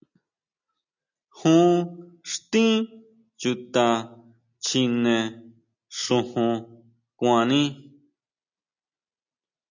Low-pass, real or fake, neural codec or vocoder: 7.2 kHz; real; none